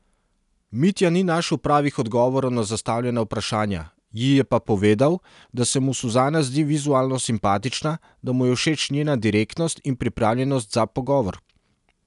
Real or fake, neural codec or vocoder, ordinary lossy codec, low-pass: real; none; none; 10.8 kHz